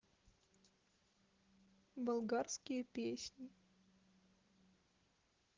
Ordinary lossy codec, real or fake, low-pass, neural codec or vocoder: Opus, 16 kbps; real; 7.2 kHz; none